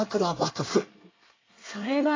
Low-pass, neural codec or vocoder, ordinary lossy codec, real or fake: 7.2 kHz; codec, 24 kHz, 1 kbps, SNAC; AAC, 32 kbps; fake